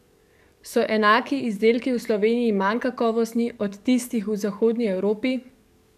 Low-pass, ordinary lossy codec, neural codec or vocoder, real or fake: 14.4 kHz; none; codec, 44.1 kHz, 7.8 kbps, DAC; fake